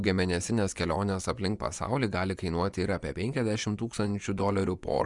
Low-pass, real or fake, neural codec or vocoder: 10.8 kHz; fake; vocoder, 24 kHz, 100 mel bands, Vocos